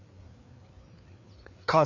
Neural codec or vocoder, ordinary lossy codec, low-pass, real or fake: codec, 16 kHz, 4 kbps, FreqCodec, larger model; none; 7.2 kHz; fake